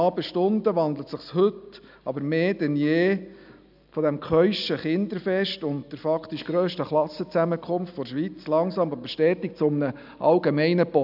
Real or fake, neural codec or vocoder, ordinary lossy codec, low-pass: real; none; AAC, 48 kbps; 5.4 kHz